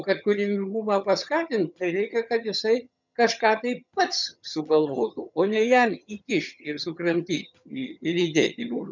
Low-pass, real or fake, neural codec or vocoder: 7.2 kHz; fake; vocoder, 22.05 kHz, 80 mel bands, HiFi-GAN